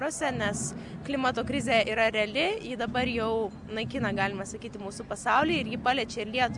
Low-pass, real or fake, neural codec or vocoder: 10.8 kHz; real; none